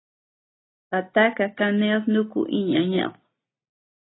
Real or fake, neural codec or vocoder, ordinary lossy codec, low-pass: real; none; AAC, 16 kbps; 7.2 kHz